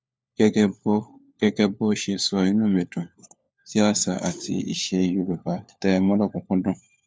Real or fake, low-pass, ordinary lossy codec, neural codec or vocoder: fake; none; none; codec, 16 kHz, 4 kbps, FunCodec, trained on LibriTTS, 50 frames a second